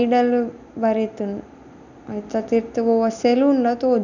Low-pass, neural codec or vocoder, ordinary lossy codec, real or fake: 7.2 kHz; none; none; real